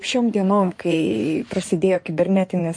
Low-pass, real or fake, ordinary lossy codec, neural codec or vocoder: 9.9 kHz; fake; MP3, 48 kbps; codec, 16 kHz in and 24 kHz out, 2.2 kbps, FireRedTTS-2 codec